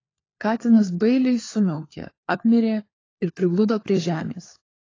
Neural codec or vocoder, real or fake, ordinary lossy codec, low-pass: codec, 16 kHz, 4 kbps, FunCodec, trained on LibriTTS, 50 frames a second; fake; AAC, 32 kbps; 7.2 kHz